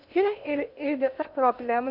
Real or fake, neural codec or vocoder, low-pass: fake; codec, 16 kHz in and 24 kHz out, 0.6 kbps, FocalCodec, streaming, 2048 codes; 5.4 kHz